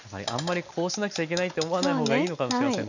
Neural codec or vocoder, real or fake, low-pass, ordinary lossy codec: none; real; 7.2 kHz; none